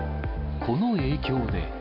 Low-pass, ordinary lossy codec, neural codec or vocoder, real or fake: 5.4 kHz; none; none; real